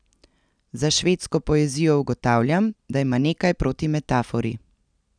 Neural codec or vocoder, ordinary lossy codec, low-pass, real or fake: none; none; 9.9 kHz; real